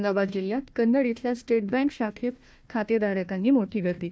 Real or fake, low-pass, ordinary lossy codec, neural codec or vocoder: fake; none; none; codec, 16 kHz, 1 kbps, FunCodec, trained on Chinese and English, 50 frames a second